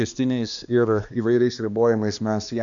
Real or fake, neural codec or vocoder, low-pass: fake; codec, 16 kHz, 2 kbps, X-Codec, HuBERT features, trained on balanced general audio; 7.2 kHz